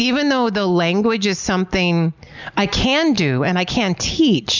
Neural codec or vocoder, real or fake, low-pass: none; real; 7.2 kHz